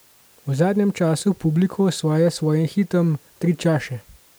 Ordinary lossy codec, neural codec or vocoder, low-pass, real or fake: none; none; none; real